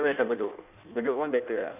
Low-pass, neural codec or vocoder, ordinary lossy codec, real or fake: 3.6 kHz; codec, 16 kHz in and 24 kHz out, 1.1 kbps, FireRedTTS-2 codec; none; fake